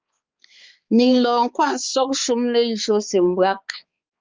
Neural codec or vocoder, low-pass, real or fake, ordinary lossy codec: codec, 16 kHz, 4 kbps, X-Codec, HuBERT features, trained on balanced general audio; 7.2 kHz; fake; Opus, 32 kbps